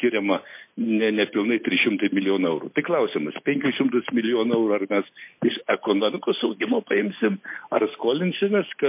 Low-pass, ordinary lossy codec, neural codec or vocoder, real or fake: 3.6 kHz; MP3, 24 kbps; none; real